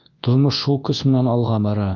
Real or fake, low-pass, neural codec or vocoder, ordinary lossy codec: fake; 7.2 kHz; codec, 24 kHz, 0.9 kbps, WavTokenizer, large speech release; Opus, 24 kbps